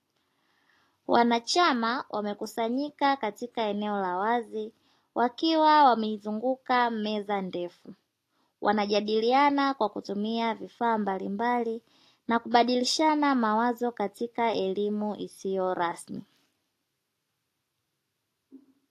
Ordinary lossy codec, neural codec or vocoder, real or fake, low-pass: AAC, 48 kbps; none; real; 14.4 kHz